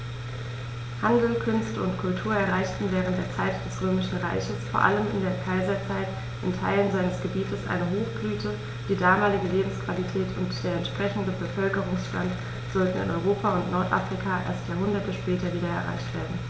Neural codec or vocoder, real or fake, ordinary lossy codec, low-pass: none; real; none; none